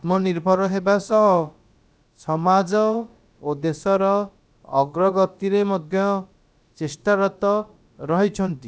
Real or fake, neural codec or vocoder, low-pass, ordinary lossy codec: fake; codec, 16 kHz, about 1 kbps, DyCAST, with the encoder's durations; none; none